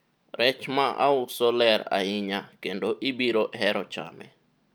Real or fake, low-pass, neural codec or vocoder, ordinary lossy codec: real; none; none; none